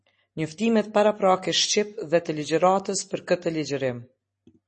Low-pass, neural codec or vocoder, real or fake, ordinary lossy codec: 10.8 kHz; none; real; MP3, 32 kbps